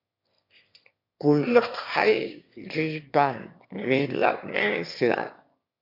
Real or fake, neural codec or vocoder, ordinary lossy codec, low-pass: fake; autoencoder, 22.05 kHz, a latent of 192 numbers a frame, VITS, trained on one speaker; MP3, 48 kbps; 5.4 kHz